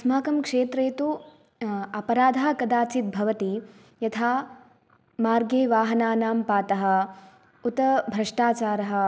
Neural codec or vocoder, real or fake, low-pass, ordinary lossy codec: none; real; none; none